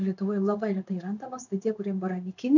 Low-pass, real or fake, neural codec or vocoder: 7.2 kHz; fake; codec, 16 kHz in and 24 kHz out, 1 kbps, XY-Tokenizer